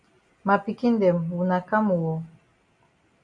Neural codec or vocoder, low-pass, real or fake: none; 9.9 kHz; real